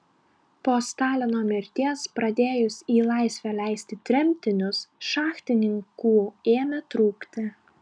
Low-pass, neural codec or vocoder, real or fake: 9.9 kHz; none; real